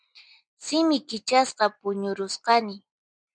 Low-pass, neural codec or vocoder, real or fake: 9.9 kHz; none; real